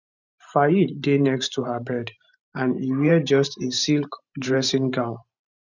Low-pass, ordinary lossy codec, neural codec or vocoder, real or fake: 7.2 kHz; none; vocoder, 44.1 kHz, 128 mel bands every 512 samples, BigVGAN v2; fake